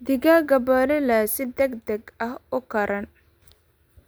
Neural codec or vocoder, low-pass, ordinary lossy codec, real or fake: none; none; none; real